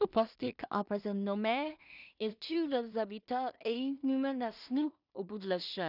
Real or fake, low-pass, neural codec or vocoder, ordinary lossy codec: fake; 5.4 kHz; codec, 16 kHz in and 24 kHz out, 0.4 kbps, LongCat-Audio-Codec, two codebook decoder; none